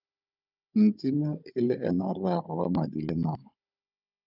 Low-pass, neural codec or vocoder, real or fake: 5.4 kHz; codec, 16 kHz, 16 kbps, FunCodec, trained on Chinese and English, 50 frames a second; fake